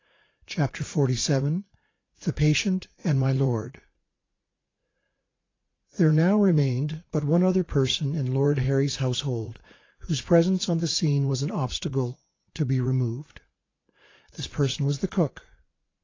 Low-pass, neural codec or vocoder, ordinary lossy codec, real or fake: 7.2 kHz; vocoder, 44.1 kHz, 80 mel bands, Vocos; AAC, 32 kbps; fake